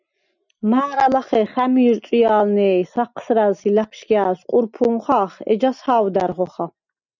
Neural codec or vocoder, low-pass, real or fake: none; 7.2 kHz; real